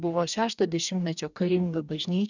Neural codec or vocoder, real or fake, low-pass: codec, 44.1 kHz, 2.6 kbps, DAC; fake; 7.2 kHz